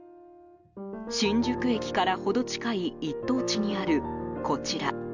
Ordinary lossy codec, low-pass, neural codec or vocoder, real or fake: none; 7.2 kHz; none; real